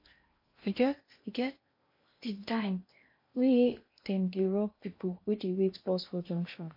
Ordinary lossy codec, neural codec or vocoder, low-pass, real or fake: MP3, 32 kbps; codec, 16 kHz in and 24 kHz out, 0.8 kbps, FocalCodec, streaming, 65536 codes; 5.4 kHz; fake